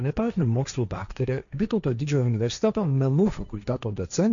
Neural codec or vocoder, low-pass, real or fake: codec, 16 kHz, 1.1 kbps, Voila-Tokenizer; 7.2 kHz; fake